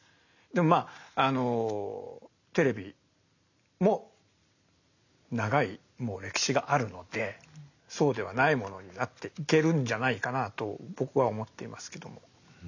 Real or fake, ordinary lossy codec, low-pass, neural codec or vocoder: real; none; 7.2 kHz; none